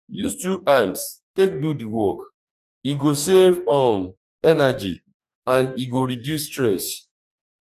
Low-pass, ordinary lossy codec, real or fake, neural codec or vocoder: 14.4 kHz; none; fake; codec, 44.1 kHz, 2.6 kbps, DAC